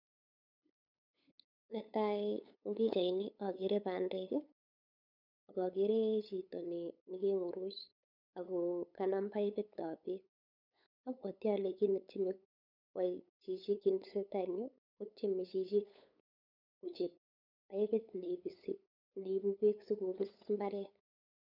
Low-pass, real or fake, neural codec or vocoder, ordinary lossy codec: 5.4 kHz; fake; codec, 16 kHz, 8 kbps, FunCodec, trained on LibriTTS, 25 frames a second; none